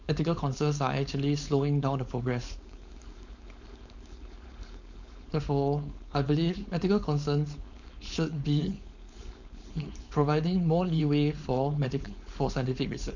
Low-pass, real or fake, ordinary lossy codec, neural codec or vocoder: 7.2 kHz; fake; none; codec, 16 kHz, 4.8 kbps, FACodec